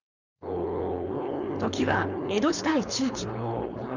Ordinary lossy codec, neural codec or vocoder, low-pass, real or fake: none; codec, 16 kHz, 4.8 kbps, FACodec; 7.2 kHz; fake